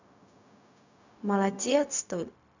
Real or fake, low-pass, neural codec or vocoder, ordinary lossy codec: fake; 7.2 kHz; codec, 16 kHz, 0.4 kbps, LongCat-Audio-Codec; none